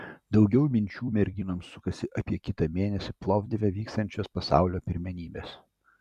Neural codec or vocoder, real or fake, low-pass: vocoder, 44.1 kHz, 128 mel bands every 256 samples, BigVGAN v2; fake; 14.4 kHz